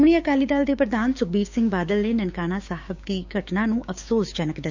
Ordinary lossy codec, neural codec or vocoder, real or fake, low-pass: Opus, 64 kbps; codec, 16 kHz, 6 kbps, DAC; fake; 7.2 kHz